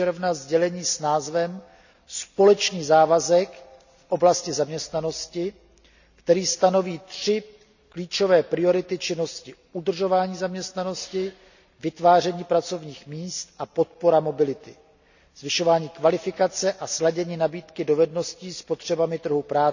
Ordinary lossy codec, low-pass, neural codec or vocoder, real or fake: none; 7.2 kHz; none; real